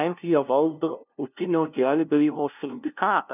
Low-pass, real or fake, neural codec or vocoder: 3.6 kHz; fake; codec, 16 kHz, 0.5 kbps, FunCodec, trained on LibriTTS, 25 frames a second